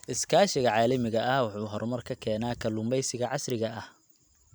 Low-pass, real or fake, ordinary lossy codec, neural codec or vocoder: none; real; none; none